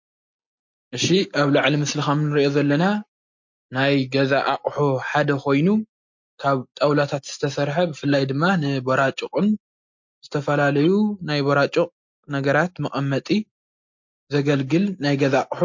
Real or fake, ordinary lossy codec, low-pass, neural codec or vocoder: real; MP3, 48 kbps; 7.2 kHz; none